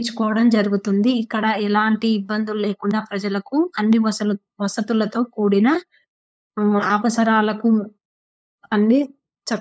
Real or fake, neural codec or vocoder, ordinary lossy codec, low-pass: fake; codec, 16 kHz, 8 kbps, FunCodec, trained on LibriTTS, 25 frames a second; none; none